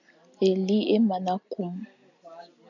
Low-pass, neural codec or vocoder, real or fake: 7.2 kHz; none; real